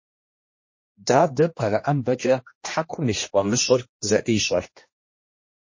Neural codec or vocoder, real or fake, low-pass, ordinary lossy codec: codec, 16 kHz, 1 kbps, X-Codec, HuBERT features, trained on general audio; fake; 7.2 kHz; MP3, 32 kbps